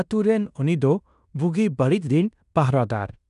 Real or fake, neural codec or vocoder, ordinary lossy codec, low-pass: fake; codec, 16 kHz in and 24 kHz out, 0.9 kbps, LongCat-Audio-Codec, fine tuned four codebook decoder; none; 10.8 kHz